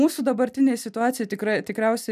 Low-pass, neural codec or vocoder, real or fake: 14.4 kHz; none; real